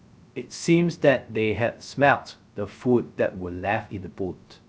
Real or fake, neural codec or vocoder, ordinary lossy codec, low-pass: fake; codec, 16 kHz, 0.3 kbps, FocalCodec; none; none